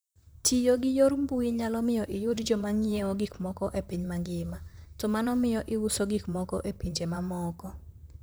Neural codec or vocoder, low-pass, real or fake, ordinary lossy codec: vocoder, 44.1 kHz, 128 mel bands, Pupu-Vocoder; none; fake; none